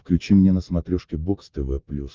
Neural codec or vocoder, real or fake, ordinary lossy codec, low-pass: none; real; Opus, 24 kbps; 7.2 kHz